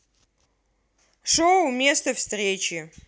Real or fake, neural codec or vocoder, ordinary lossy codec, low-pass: real; none; none; none